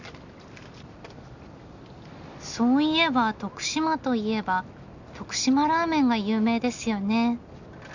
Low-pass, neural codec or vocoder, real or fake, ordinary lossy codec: 7.2 kHz; none; real; none